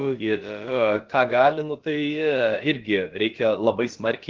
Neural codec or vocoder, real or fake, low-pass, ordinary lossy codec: codec, 16 kHz, about 1 kbps, DyCAST, with the encoder's durations; fake; 7.2 kHz; Opus, 16 kbps